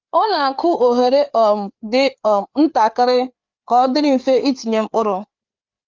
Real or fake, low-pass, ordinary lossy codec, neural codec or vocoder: fake; 7.2 kHz; Opus, 24 kbps; codec, 16 kHz, 4 kbps, FreqCodec, larger model